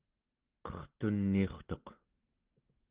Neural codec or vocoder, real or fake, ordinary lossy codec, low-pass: none; real; Opus, 16 kbps; 3.6 kHz